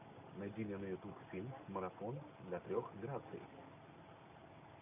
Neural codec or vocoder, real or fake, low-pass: none; real; 3.6 kHz